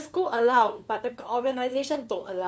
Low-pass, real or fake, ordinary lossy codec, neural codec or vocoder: none; fake; none; codec, 16 kHz, 2 kbps, FunCodec, trained on LibriTTS, 25 frames a second